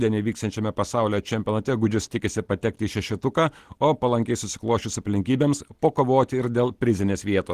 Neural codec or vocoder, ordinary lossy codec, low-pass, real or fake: none; Opus, 16 kbps; 14.4 kHz; real